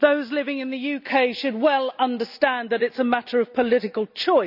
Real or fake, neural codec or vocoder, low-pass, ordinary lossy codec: real; none; 5.4 kHz; none